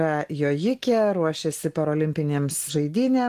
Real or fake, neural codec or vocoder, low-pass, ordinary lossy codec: real; none; 14.4 kHz; Opus, 24 kbps